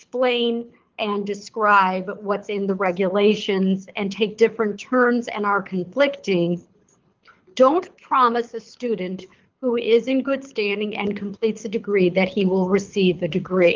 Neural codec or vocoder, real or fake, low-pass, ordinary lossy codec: codec, 24 kHz, 6 kbps, HILCodec; fake; 7.2 kHz; Opus, 32 kbps